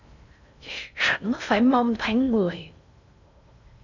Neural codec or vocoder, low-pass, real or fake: codec, 16 kHz in and 24 kHz out, 0.6 kbps, FocalCodec, streaming, 4096 codes; 7.2 kHz; fake